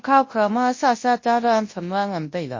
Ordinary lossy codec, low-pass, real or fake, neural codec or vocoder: MP3, 32 kbps; 7.2 kHz; fake; codec, 24 kHz, 0.9 kbps, WavTokenizer, large speech release